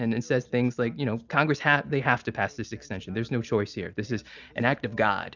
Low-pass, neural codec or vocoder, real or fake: 7.2 kHz; none; real